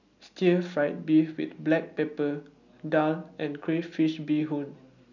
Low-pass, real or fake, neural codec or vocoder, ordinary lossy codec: 7.2 kHz; real; none; none